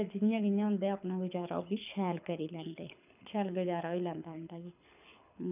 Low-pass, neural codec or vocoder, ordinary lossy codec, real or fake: 3.6 kHz; codec, 24 kHz, 6 kbps, HILCodec; none; fake